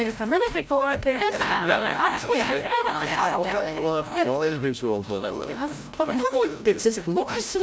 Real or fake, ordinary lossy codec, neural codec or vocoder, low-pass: fake; none; codec, 16 kHz, 0.5 kbps, FreqCodec, larger model; none